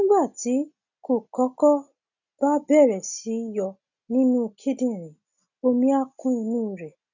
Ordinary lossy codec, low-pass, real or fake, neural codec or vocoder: none; 7.2 kHz; real; none